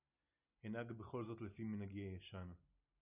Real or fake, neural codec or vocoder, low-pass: real; none; 3.6 kHz